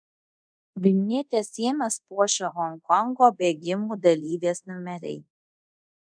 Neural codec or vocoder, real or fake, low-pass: codec, 24 kHz, 0.5 kbps, DualCodec; fake; 9.9 kHz